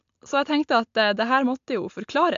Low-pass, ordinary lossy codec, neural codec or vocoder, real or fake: 7.2 kHz; AAC, 64 kbps; none; real